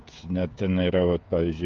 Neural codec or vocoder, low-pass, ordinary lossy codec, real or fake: codec, 16 kHz, 16 kbps, FreqCodec, smaller model; 7.2 kHz; Opus, 24 kbps; fake